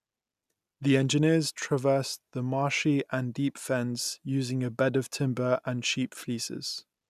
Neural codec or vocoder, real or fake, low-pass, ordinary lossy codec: none; real; 14.4 kHz; none